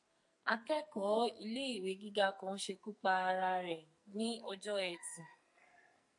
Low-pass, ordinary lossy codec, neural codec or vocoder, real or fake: 10.8 kHz; AAC, 64 kbps; codec, 44.1 kHz, 2.6 kbps, SNAC; fake